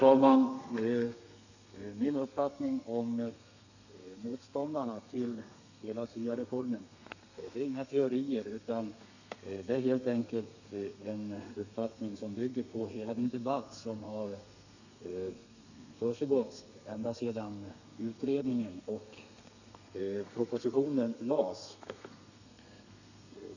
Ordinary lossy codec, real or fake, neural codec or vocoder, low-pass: none; fake; codec, 32 kHz, 1.9 kbps, SNAC; 7.2 kHz